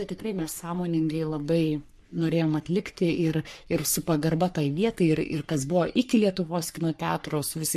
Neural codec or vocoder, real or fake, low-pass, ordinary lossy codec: codec, 44.1 kHz, 3.4 kbps, Pupu-Codec; fake; 14.4 kHz; MP3, 64 kbps